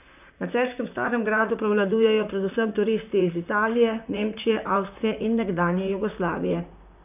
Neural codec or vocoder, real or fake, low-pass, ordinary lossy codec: vocoder, 44.1 kHz, 128 mel bands, Pupu-Vocoder; fake; 3.6 kHz; none